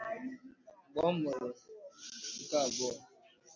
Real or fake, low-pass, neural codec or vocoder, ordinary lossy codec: real; 7.2 kHz; none; MP3, 64 kbps